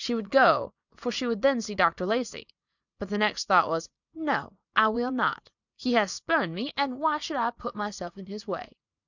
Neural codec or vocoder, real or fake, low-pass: vocoder, 44.1 kHz, 80 mel bands, Vocos; fake; 7.2 kHz